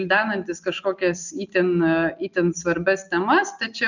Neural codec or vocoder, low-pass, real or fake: none; 7.2 kHz; real